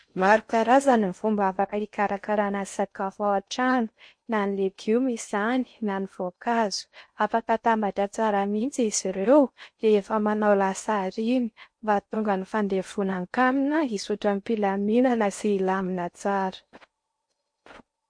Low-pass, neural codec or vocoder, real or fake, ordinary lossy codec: 9.9 kHz; codec, 16 kHz in and 24 kHz out, 0.6 kbps, FocalCodec, streaming, 4096 codes; fake; MP3, 48 kbps